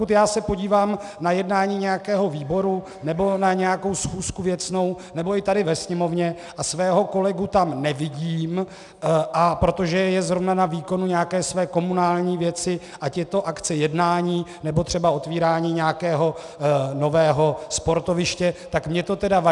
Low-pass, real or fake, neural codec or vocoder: 10.8 kHz; real; none